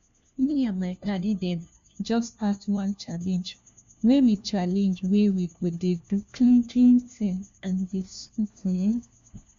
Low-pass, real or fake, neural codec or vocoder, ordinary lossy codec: 7.2 kHz; fake; codec, 16 kHz, 1 kbps, FunCodec, trained on LibriTTS, 50 frames a second; MP3, 64 kbps